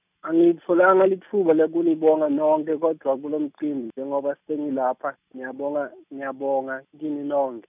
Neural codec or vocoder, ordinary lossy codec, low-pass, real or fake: none; none; 3.6 kHz; real